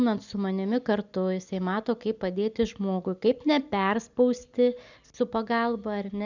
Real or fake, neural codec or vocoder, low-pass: real; none; 7.2 kHz